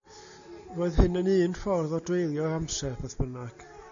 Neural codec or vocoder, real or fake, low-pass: none; real; 7.2 kHz